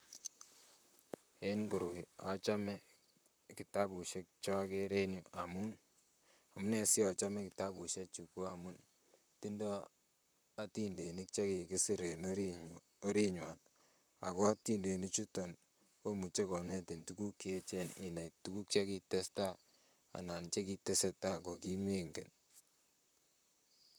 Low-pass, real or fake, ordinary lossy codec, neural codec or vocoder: none; fake; none; vocoder, 44.1 kHz, 128 mel bands, Pupu-Vocoder